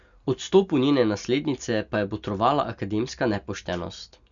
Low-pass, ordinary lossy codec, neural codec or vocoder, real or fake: 7.2 kHz; none; none; real